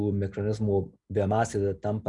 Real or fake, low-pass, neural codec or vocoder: real; 10.8 kHz; none